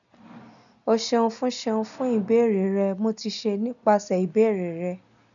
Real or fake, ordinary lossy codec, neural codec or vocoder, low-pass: real; none; none; 7.2 kHz